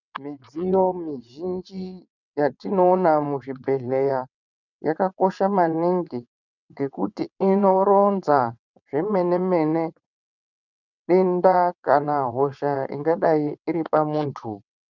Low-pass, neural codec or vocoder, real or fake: 7.2 kHz; vocoder, 22.05 kHz, 80 mel bands, WaveNeXt; fake